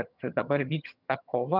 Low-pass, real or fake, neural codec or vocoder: 5.4 kHz; fake; vocoder, 22.05 kHz, 80 mel bands, HiFi-GAN